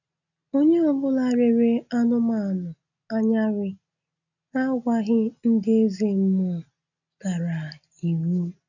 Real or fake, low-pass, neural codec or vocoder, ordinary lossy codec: real; 7.2 kHz; none; none